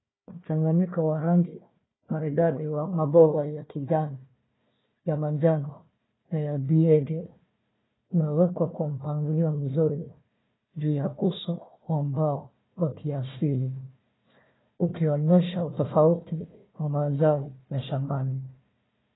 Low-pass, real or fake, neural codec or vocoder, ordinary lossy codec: 7.2 kHz; fake; codec, 16 kHz, 1 kbps, FunCodec, trained on Chinese and English, 50 frames a second; AAC, 16 kbps